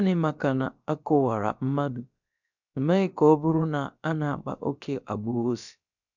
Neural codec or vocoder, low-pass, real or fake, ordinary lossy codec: codec, 16 kHz, about 1 kbps, DyCAST, with the encoder's durations; 7.2 kHz; fake; none